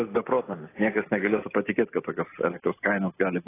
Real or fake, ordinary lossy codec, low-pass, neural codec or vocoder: real; AAC, 16 kbps; 3.6 kHz; none